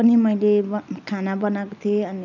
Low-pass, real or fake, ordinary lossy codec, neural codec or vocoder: 7.2 kHz; real; none; none